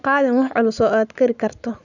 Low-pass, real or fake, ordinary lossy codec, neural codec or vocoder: 7.2 kHz; real; none; none